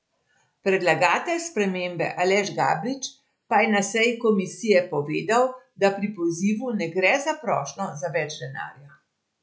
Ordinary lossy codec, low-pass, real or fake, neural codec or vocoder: none; none; real; none